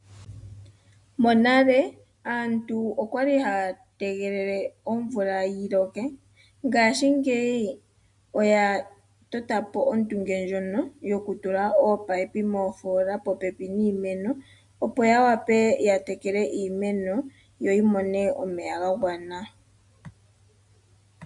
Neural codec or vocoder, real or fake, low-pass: none; real; 10.8 kHz